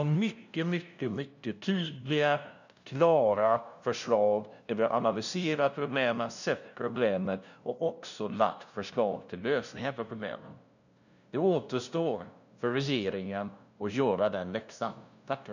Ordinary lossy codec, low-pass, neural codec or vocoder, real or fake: none; 7.2 kHz; codec, 16 kHz, 0.5 kbps, FunCodec, trained on LibriTTS, 25 frames a second; fake